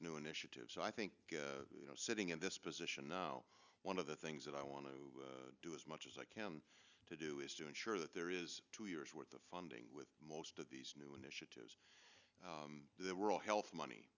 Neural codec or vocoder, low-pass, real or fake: none; 7.2 kHz; real